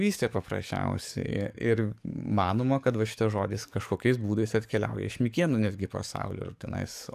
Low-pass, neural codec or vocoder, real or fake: 14.4 kHz; codec, 44.1 kHz, 7.8 kbps, DAC; fake